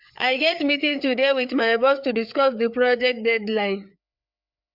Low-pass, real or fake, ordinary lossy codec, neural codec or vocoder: 5.4 kHz; fake; MP3, 48 kbps; codec, 16 kHz, 4 kbps, FreqCodec, larger model